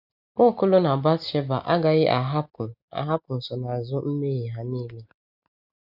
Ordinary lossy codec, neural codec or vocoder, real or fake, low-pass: none; none; real; 5.4 kHz